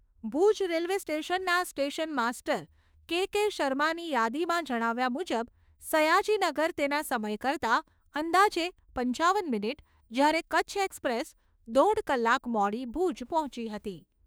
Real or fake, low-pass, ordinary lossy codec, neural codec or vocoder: fake; none; none; autoencoder, 48 kHz, 32 numbers a frame, DAC-VAE, trained on Japanese speech